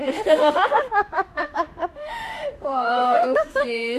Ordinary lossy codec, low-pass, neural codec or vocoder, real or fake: none; 14.4 kHz; autoencoder, 48 kHz, 32 numbers a frame, DAC-VAE, trained on Japanese speech; fake